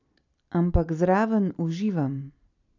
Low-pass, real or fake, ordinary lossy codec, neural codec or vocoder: 7.2 kHz; real; none; none